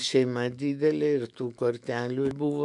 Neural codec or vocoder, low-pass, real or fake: autoencoder, 48 kHz, 128 numbers a frame, DAC-VAE, trained on Japanese speech; 10.8 kHz; fake